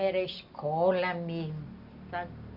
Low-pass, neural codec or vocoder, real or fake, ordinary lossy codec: 5.4 kHz; none; real; none